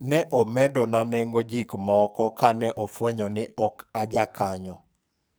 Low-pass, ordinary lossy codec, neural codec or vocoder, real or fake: none; none; codec, 44.1 kHz, 2.6 kbps, SNAC; fake